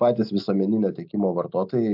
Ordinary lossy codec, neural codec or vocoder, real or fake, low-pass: AAC, 48 kbps; none; real; 5.4 kHz